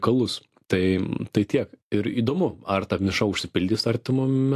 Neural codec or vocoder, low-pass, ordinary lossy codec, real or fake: none; 14.4 kHz; AAC, 64 kbps; real